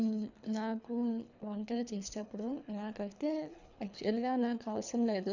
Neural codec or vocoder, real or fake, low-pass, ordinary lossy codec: codec, 24 kHz, 3 kbps, HILCodec; fake; 7.2 kHz; none